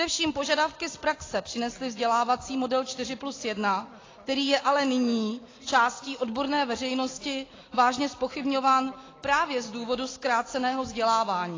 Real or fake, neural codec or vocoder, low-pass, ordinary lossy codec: real; none; 7.2 kHz; AAC, 32 kbps